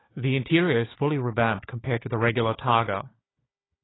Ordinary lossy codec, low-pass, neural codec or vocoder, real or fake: AAC, 16 kbps; 7.2 kHz; codec, 16 kHz, 4 kbps, FunCodec, trained on Chinese and English, 50 frames a second; fake